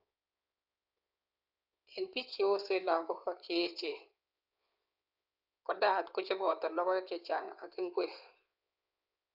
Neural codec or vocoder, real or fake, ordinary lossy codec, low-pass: codec, 16 kHz in and 24 kHz out, 2.2 kbps, FireRedTTS-2 codec; fake; none; 5.4 kHz